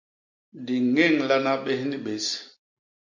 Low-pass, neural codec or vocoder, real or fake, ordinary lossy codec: 7.2 kHz; none; real; MP3, 48 kbps